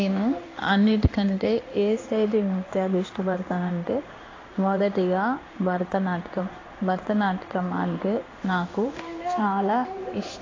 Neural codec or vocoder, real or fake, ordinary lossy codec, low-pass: codec, 16 kHz in and 24 kHz out, 1 kbps, XY-Tokenizer; fake; AAC, 32 kbps; 7.2 kHz